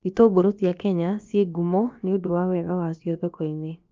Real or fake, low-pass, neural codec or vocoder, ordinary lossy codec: fake; 7.2 kHz; codec, 16 kHz, about 1 kbps, DyCAST, with the encoder's durations; Opus, 24 kbps